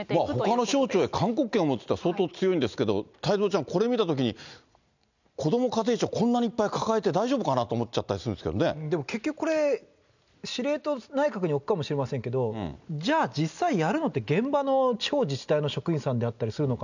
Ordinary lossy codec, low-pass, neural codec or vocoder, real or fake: none; 7.2 kHz; none; real